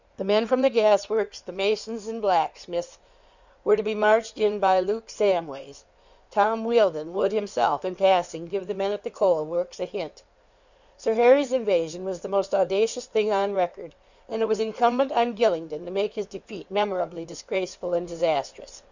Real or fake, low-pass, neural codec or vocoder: fake; 7.2 kHz; codec, 16 kHz in and 24 kHz out, 2.2 kbps, FireRedTTS-2 codec